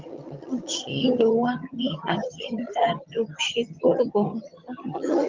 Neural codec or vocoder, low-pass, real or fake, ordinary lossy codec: vocoder, 22.05 kHz, 80 mel bands, HiFi-GAN; 7.2 kHz; fake; Opus, 32 kbps